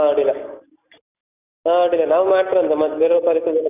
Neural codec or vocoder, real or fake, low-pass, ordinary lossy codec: none; real; 3.6 kHz; none